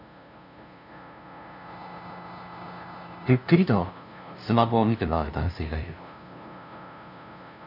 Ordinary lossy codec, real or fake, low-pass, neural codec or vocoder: none; fake; 5.4 kHz; codec, 16 kHz, 0.5 kbps, FunCodec, trained on LibriTTS, 25 frames a second